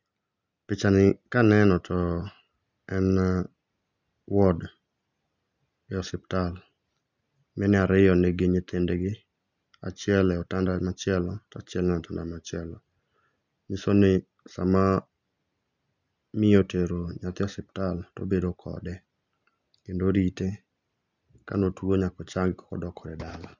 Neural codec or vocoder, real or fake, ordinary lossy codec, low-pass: none; real; none; 7.2 kHz